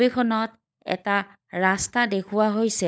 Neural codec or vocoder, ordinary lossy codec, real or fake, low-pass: codec, 16 kHz, 16 kbps, FunCodec, trained on LibriTTS, 50 frames a second; none; fake; none